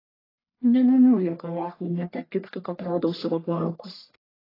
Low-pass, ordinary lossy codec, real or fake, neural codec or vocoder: 5.4 kHz; AAC, 24 kbps; fake; codec, 44.1 kHz, 1.7 kbps, Pupu-Codec